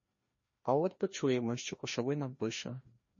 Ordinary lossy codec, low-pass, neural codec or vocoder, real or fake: MP3, 32 kbps; 7.2 kHz; codec, 16 kHz, 1 kbps, FreqCodec, larger model; fake